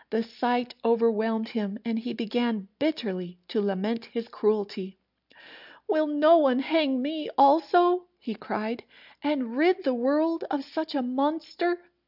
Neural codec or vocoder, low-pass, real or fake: none; 5.4 kHz; real